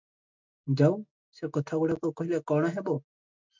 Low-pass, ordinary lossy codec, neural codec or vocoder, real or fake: 7.2 kHz; MP3, 64 kbps; none; real